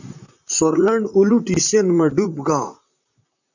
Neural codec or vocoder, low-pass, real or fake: vocoder, 44.1 kHz, 128 mel bands, Pupu-Vocoder; 7.2 kHz; fake